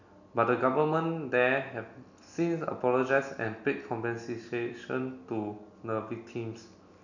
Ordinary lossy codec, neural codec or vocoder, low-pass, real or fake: none; none; 7.2 kHz; real